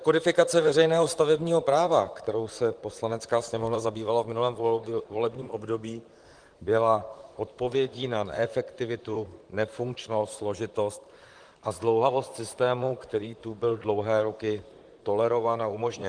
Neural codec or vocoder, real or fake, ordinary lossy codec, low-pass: vocoder, 44.1 kHz, 128 mel bands, Pupu-Vocoder; fake; Opus, 24 kbps; 9.9 kHz